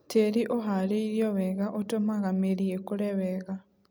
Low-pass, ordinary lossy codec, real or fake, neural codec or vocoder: none; none; real; none